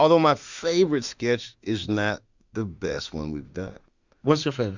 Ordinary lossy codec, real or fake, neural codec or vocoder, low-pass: Opus, 64 kbps; fake; autoencoder, 48 kHz, 32 numbers a frame, DAC-VAE, trained on Japanese speech; 7.2 kHz